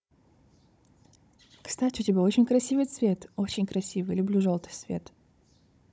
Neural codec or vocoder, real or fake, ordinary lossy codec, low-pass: codec, 16 kHz, 16 kbps, FunCodec, trained on Chinese and English, 50 frames a second; fake; none; none